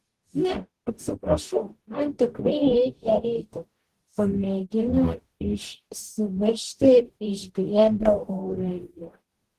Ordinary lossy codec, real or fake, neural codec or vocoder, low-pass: Opus, 16 kbps; fake; codec, 44.1 kHz, 0.9 kbps, DAC; 14.4 kHz